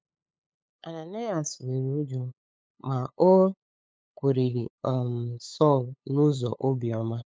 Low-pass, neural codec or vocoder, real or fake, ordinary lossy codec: none; codec, 16 kHz, 8 kbps, FunCodec, trained on LibriTTS, 25 frames a second; fake; none